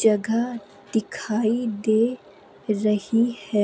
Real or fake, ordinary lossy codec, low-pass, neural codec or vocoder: real; none; none; none